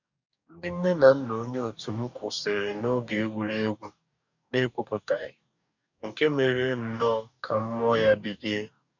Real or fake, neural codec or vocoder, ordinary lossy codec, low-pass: fake; codec, 44.1 kHz, 2.6 kbps, DAC; none; 7.2 kHz